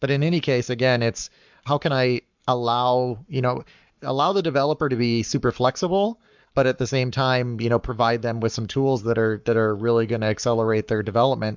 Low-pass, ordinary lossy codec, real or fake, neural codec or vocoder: 7.2 kHz; MP3, 64 kbps; fake; codec, 44.1 kHz, 7.8 kbps, Pupu-Codec